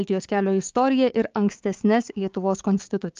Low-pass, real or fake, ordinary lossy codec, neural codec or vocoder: 7.2 kHz; fake; Opus, 32 kbps; codec, 16 kHz, 6 kbps, DAC